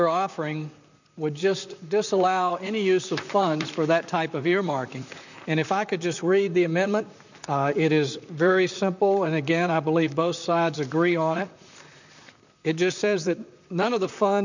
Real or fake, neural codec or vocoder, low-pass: fake; vocoder, 44.1 kHz, 128 mel bands, Pupu-Vocoder; 7.2 kHz